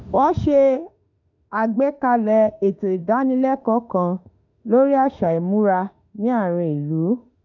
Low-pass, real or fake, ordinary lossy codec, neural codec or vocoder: 7.2 kHz; fake; none; autoencoder, 48 kHz, 32 numbers a frame, DAC-VAE, trained on Japanese speech